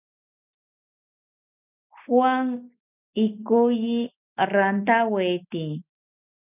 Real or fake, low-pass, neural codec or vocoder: real; 3.6 kHz; none